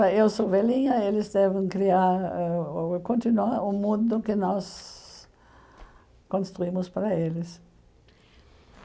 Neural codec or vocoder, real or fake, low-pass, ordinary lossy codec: none; real; none; none